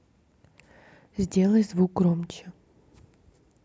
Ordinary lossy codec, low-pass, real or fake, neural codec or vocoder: none; none; real; none